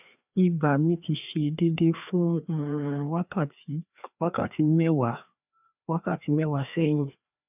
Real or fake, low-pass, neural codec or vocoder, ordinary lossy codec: fake; 3.6 kHz; codec, 16 kHz, 2 kbps, FreqCodec, larger model; none